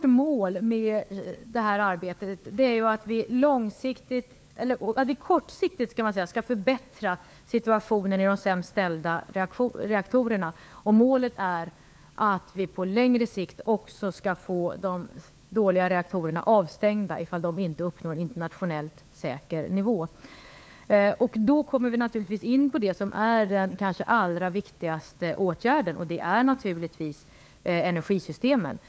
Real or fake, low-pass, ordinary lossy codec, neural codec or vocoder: fake; none; none; codec, 16 kHz, 4 kbps, FunCodec, trained on LibriTTS, 50 frames a second